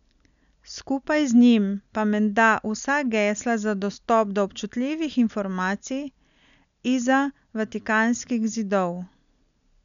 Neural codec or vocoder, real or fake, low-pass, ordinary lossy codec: none; real; 7.2 kHz; none